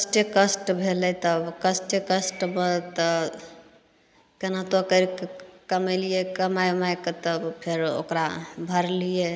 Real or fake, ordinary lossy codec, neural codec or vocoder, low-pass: real; none; none; none